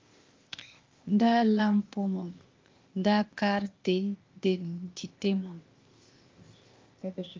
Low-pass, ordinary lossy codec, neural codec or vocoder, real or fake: 7.2 kHz; Opus, 32 kbps; codec, 16 kHz, 0.8 kbps, ZipCodec; fake